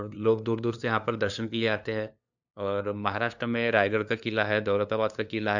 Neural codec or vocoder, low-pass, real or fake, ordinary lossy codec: codec, 16 kHz, 2 kbps, FunCodec, trained on LibriTTS, 25 frames a second; 7.2 kHz; fake; none